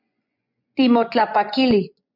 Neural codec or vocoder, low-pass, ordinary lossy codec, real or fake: vocoder, 24 kHz, 100 mel bands, Vocos; 5.4 kHz; MP3, 48 kbps; fake